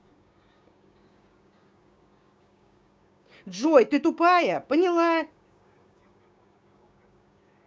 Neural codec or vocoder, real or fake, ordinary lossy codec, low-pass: codec, 16 kHz, 6 kbps, DAC; fake; none; none